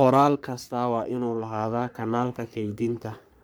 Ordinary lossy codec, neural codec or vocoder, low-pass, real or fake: none; codec, 44.1 kHz, 3.4 kbps, Pupu-Codec; none; fake